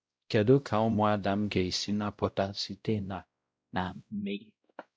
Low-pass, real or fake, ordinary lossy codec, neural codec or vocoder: none; fake; none; codec, 16 kHz, 0.5 kbps, X-Codec, WavLM features, trained on Multilingual LibriSpeech